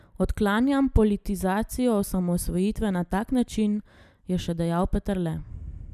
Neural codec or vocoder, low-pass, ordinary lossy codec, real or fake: none; 14.4 kHz; none; real